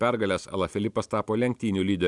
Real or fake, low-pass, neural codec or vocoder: real; 10.8 kHz; none